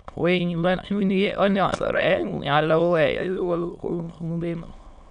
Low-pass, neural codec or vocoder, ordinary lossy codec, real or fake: 9.9 kHz; autoencoder, 22.05 kHz, a latent of 192 numbers a frame, VITS, trained on many speakers; none; fake